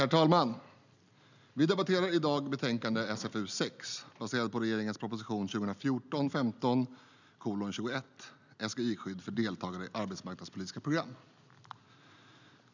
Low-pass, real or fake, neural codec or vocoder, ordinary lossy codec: 7.2 kHz; real; none; none